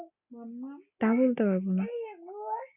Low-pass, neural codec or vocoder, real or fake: 3.6 kHz; none; real